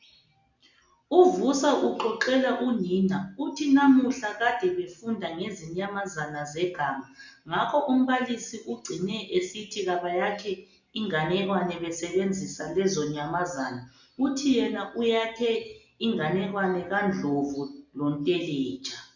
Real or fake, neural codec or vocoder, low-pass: real; none; 7.2 kHz